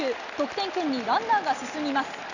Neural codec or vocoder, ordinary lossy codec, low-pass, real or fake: none; Opus, 64 kbps; 7.2 kHz; real